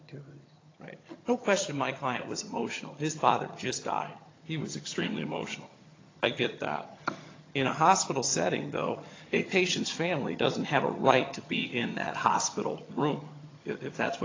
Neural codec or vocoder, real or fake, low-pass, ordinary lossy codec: vocoder, 22.05 kHz, 80 mel bands, HiFi-GAN; fake; 7.2 kHz; AAC, 32 kbps